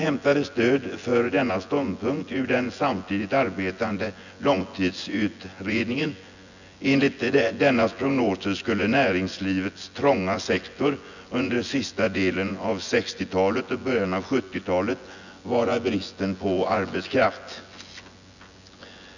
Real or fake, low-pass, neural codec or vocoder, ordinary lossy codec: fake; 7.2 kHz; vocoder, 24 kHz, 100 mel bands, Vocos; none